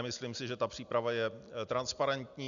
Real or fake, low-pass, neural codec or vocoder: real; 7.2 kHz; none